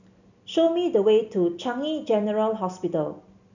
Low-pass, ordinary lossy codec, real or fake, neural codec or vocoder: 7.2 kHz; none; real; none